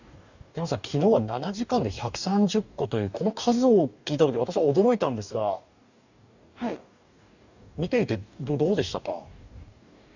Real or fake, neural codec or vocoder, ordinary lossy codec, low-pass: fake; codec, 44.1 kHz, 2.6 kbps, DAC; none; 7.2 kHz